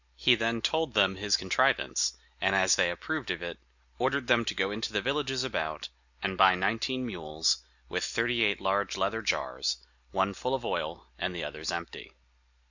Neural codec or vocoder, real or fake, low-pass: none; real; 7.2 kHz